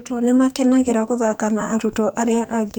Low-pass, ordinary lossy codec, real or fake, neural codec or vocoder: none; none; fake; codec, 44.1 kHz, 2.6 kbps, SNAC